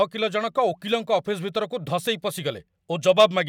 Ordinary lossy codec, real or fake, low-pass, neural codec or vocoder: none; real; 19.8 kHz; none